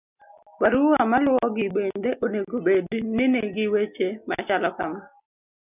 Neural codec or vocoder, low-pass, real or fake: none; 3.6 kHz; real